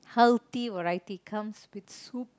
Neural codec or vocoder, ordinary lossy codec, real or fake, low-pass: none; none; real; none